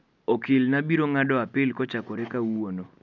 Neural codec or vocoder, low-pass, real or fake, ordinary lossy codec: none; 7.2 kHz; real; none